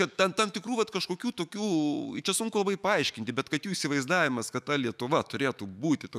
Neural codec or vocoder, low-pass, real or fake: autoencoder, 48 kHz, 128 numbers a frame, DAC-VAE, trained on Japanese speech; 14.4 kHz; fake